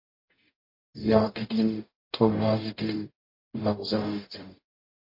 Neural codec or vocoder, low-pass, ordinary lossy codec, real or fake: codec, 44.1 kHz, 0.9 kbps, DAC; 5.4 kHz; MP3, 32 kbps; fake